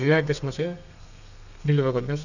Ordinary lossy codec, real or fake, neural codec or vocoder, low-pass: none; fake; codec, 32 kHz, 1.9 kbps, SNAC; 7.2 kHz